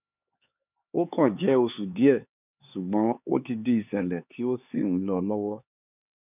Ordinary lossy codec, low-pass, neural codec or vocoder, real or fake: none; 3.6 kHz; codec, 16 kHz, 4 kbps, X-Codec, HuBERT features, trained on LibriSpeech; fake